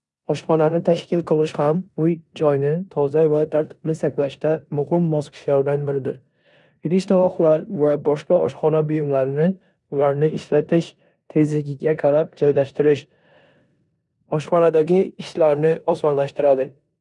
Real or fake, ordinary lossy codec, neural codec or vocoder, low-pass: fake; none; codec, 16 kHz in and 24 kHz out, 0.9 kbps, LongCat-Audio-Codec, four codebook decoder; 10.8 kHz